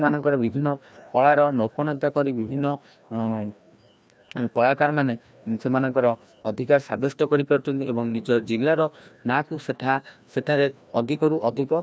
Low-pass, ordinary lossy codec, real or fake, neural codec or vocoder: none; none; fake; codec, 16 kHz, 1 kbps, FreqCodec, larger model